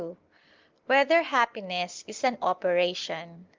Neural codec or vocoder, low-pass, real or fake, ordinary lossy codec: none; 7.2 kHz; real; Opus, 24 kbps